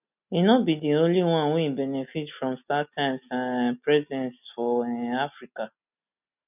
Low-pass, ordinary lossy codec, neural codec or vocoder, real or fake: 3.6 kHz; none; none; real